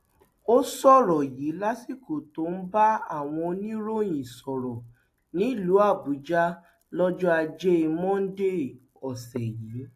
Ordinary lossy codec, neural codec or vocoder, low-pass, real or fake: AAC, 64 kbps; none; 14.4 kHz; real